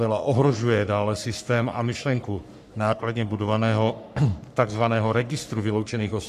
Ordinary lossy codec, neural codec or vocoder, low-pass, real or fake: AAC, 96 kbps; codec, 44.1 kHz, 3.4 kbps, Pupu-Codec; 14.4 kHz; fake